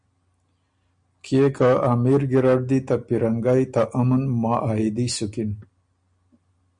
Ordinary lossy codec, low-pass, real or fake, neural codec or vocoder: MP3, 96 kbps; 9.9 kHz; real; none